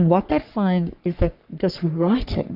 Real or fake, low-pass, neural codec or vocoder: fake; 5.4 kHz; codec, 44.1 kHz, 3.4 kbps, Pupu-Codec